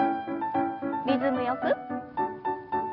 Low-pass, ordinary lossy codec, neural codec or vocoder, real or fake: 5.4 kHz; none; none; real